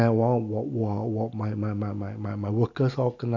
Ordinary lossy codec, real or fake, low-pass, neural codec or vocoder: none; real; 7.2 kHz; none